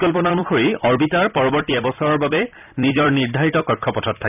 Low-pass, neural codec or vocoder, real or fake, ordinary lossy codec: 3.6 kHz; vocoder, 44.1 kHz, 128 mel bands every 256 samples, BigVGAN v2; fake; none